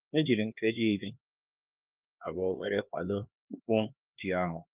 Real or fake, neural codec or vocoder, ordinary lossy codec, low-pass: fake; codec, 16 kHz, 2 kbps, X-Codec, HuBERT features, trained on LibriSpeech; Opus, 24 kbps; 3.6 kHz